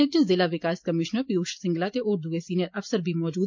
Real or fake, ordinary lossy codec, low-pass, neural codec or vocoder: real; MP3, 48 kbps; 7.2 kHz; none